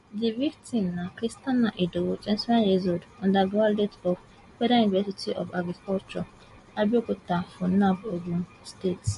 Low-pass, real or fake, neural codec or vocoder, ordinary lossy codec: 14.4 kHz; real; none; MP3, 48 kbps